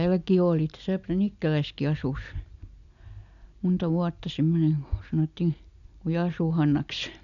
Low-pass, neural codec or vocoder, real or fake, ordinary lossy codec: 7.2 kHz; none; real; none